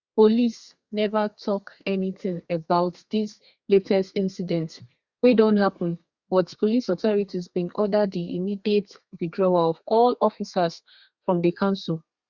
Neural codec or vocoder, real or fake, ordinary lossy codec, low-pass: codec, 32 kHz, 1.9 kbps, SNAC; fake; Opus, 64 kbps; 7.2 kHz